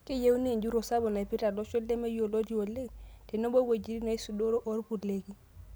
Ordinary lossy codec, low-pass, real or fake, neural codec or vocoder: none; none; real; none